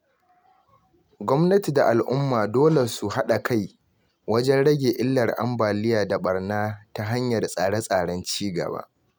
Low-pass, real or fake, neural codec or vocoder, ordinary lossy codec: none; real; none; none